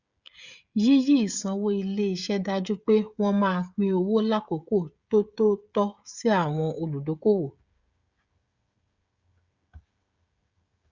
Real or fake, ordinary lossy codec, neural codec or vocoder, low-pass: fake; Opus, 64 kbps; codec, 16 kHz, 16 kbps, FreqCodec, smaller model; 7.2 kHz